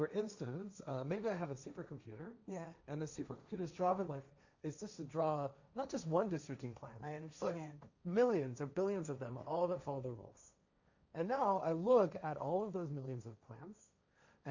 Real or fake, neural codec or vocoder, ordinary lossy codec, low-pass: fake; codec, 16 kHz, 1.1 kbps, Voila-Tokenizer; Opus, 64 kbps; 7.2 kHz